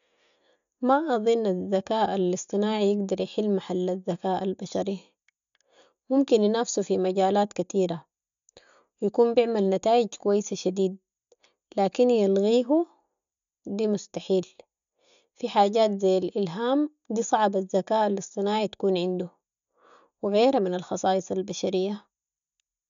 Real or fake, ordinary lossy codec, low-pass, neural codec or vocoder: real; none; 7.2 kHz; none